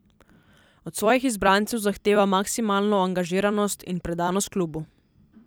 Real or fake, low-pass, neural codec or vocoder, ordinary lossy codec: fake; none; vocoder, 44.1 kHz, 128 mel bands every 256 samples, BigVGAN v2; none